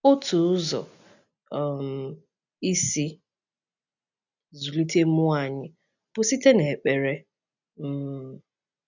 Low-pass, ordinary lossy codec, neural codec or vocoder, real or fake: 7.2 kHz; none; none; real